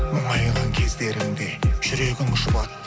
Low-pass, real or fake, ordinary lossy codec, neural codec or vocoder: none; real; none; none